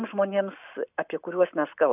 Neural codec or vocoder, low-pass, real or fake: none; 3.6 kHz; real